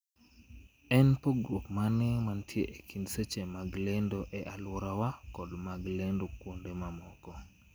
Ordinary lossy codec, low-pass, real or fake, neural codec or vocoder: none; none; real; none